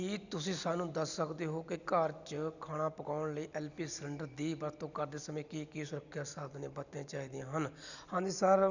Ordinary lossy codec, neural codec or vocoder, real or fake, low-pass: none; none; real; 7.2 kHz